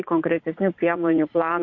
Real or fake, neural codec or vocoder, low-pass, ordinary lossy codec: fake; vocoder, 44.1 kHz, 80 mel bands, Vocos; 7.2 kHz; MP3, 48 kbps